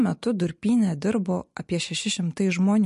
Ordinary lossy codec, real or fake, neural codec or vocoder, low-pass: MP3, 48 kbps; real; none; 14.4 kHz